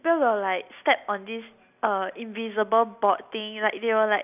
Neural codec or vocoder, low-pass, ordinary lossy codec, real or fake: none; 3.6 kHz; none; real